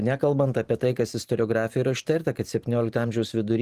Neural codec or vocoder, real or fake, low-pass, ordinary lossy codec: vocoder, 44.1 kHz, 128 mel bands every 512 samples, BigVGAN v2; fake; 14.4 kHz; Opus, 24 kbps